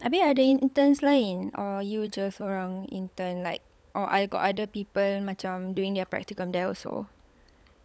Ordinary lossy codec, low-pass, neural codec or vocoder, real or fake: none; none; codec, 16 kHz, 16 kbps, FunCodec, trained on LibriTTS, 50 frames a second; fake